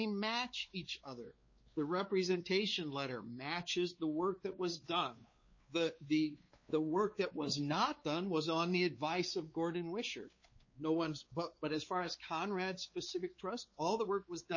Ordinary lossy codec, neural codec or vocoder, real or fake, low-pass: MP3, 32 kbps; codec, 16 kHz, 4 kbps, X-Codec, WavLM features, trained on Multilingual LibriSpeech; fake; 7.2 kHz